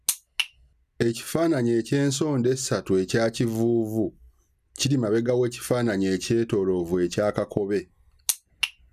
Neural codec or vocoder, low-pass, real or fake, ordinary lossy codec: none; 14.4 kHz; real; none